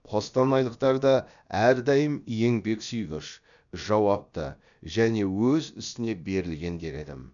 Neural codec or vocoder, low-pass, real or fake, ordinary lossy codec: codec, 16 kHz, about 1 kbps, DyCAST, with the encoder's durations; 7.2 kHz; fake; none